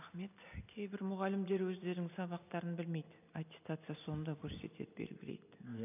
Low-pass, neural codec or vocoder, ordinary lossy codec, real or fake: 3.6 kHz; none; none; real